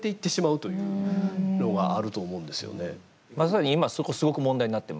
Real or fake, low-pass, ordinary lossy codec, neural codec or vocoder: real; none; none; none